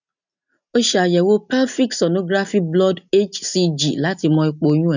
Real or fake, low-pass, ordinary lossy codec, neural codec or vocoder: real; 7.2 kHz; none; none